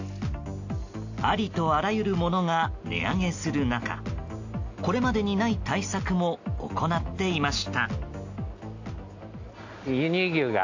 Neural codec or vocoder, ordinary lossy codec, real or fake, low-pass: none; AAC, 48 kbps; real; 7.2 kHz